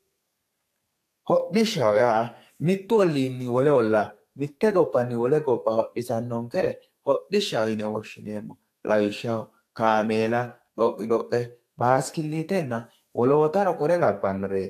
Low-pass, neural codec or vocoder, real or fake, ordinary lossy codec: 14.4 kHz; codec, 32 kHz, 1.9 kbps, SNAC; fake; AAC, 64 kbps